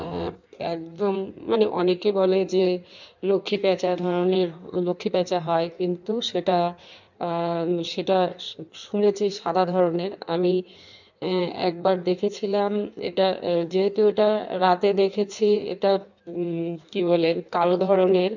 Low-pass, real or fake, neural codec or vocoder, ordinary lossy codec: 7.2 kHz; fake; codec, 16 kHz in and 24 kHz out, 1.1 kbps, FireRedTTS-2 codec; none